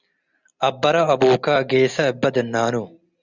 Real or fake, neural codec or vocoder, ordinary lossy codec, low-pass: real; none; Opus, 64 kbps; 7.2 kHz